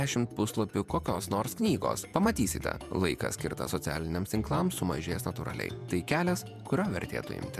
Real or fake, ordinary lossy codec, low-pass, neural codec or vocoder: fake; MP3, 96 kbps; 14.4 kHz; vocoder, 48 kHz, 128 mel bands, Vocos